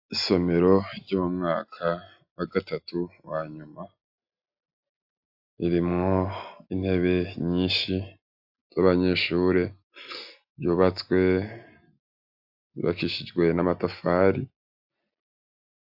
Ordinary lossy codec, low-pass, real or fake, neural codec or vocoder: AAC, 48 kbps; 5.4 kHz; real; none